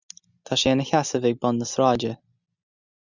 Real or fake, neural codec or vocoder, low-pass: real; none; 7.2 kHz